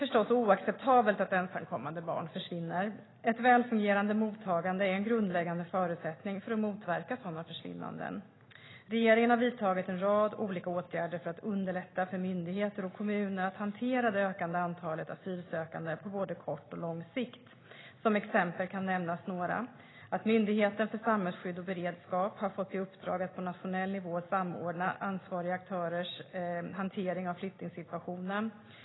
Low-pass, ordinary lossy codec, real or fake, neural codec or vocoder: 7.2 kHz; AAC, 16 kbps; real; none